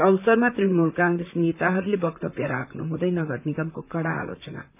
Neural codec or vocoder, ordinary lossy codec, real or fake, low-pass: vocoder, 44.1 kHz, 128 mel bands, Pupu-Vocoder; none; fake; 3.6 kHz